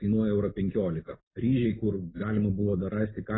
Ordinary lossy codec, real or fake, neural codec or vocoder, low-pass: AAC, 16 kbps; real; none; 7.2 kHz